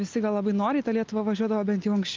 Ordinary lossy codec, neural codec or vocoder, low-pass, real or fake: Opus, 24 kbps; none; 7.2 kHz; real